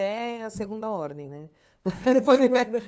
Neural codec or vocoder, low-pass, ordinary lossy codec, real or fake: codec, 16 kHz, 2 kbps, FunCodec, trained on LibriTTS, 25 frames a second; none; none; fake